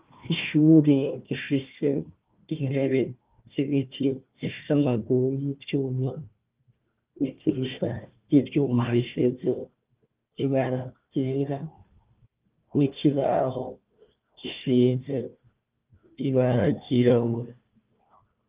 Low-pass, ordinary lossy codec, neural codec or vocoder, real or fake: 3.6 kHz; Opus, 32 kbps; codec, 16 kHz, 1 kbps, FunCodec, trained on Chinese and English, 50 frames a second; fake